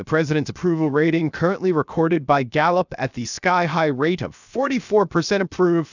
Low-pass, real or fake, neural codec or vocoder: 7.2 kHz; fake; codec, 16 kHz, 0.7 kbps, FocalCodec